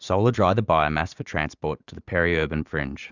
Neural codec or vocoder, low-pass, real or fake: vocoder, 44.1 kHz, 80 mel bands, Vocos; 7.2 kHz; fake